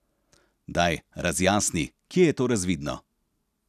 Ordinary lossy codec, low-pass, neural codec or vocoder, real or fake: none; 14.4 kHz; none; real